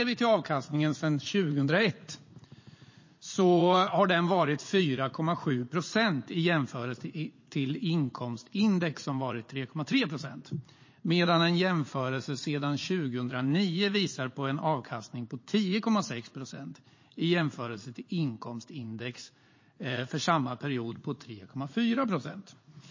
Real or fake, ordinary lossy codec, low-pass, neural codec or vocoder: fake; MP3, 32 kbps; 7.2 kHz; vocoder, 44.1 kHz, 80 mel bands, Vocos